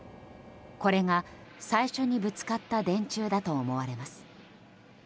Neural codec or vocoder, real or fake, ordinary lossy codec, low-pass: none; real; none; none